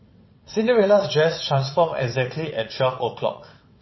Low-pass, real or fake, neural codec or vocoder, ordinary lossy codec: 7.2 kHz; fake; vocoder, 22.05 kHz, 80 mel bands, WaveNeXt; MP3, 24 kbps